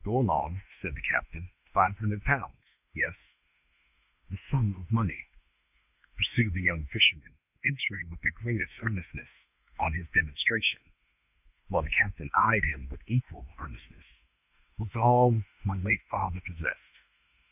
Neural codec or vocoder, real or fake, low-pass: autoencoder, 48 kHz, 32 numbers a frame, DAC-VAE, trained on Japanese speech; fake; 3.6 kHz